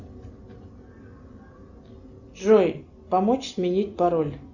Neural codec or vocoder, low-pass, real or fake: none; 7.2 kHz; real